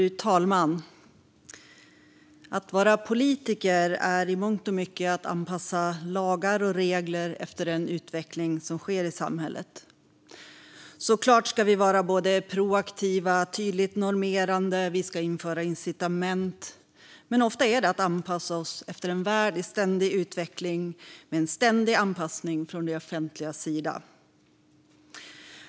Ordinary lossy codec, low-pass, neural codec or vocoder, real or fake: none; none; none; real